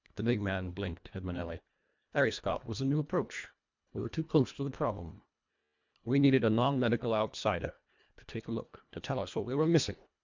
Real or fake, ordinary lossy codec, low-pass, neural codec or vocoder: fake; MP3, 64 kbps; 7.2 kHz; codec, 24 kHz, 1.5 kbps, HILCodec